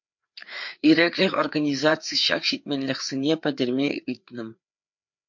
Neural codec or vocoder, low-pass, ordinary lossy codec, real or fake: codec, 16 kHz, 4 kbps, FreqCodec, larger model; 7.2 kHz; MP3, 48 kbps; fake